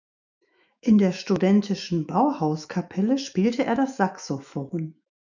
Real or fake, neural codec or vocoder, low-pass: fake; autoencoder, 48 kHz, 128 numbers a frame, DAC-VAE, trained on Japanese speech; 7.2 kHz